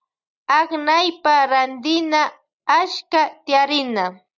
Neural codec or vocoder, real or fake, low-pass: none; real; 7.2 kHz